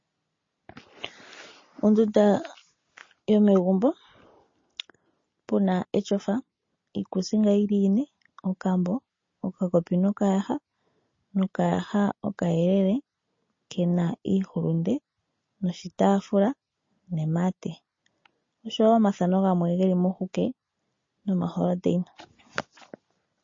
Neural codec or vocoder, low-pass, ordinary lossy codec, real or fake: none; 7.2 kHz; MP3, 32 kbps; real